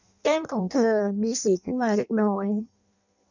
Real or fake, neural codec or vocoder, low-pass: fake; codec, 16 kHz in and 24 kHz out, 0.6 kbps, FireRedTTS-2 codec; 7.2 kHz